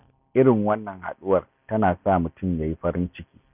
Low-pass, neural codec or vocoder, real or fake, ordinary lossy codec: 3.6 kHz; none; real; none